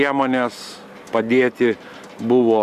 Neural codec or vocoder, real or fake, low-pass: none; real; 14.4 kHz